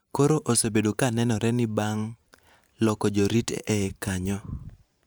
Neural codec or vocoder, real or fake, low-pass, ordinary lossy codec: vocoder, 44.1 kHz, 128 mel bands every 512 samples, BigVGAN v2; fake; none; none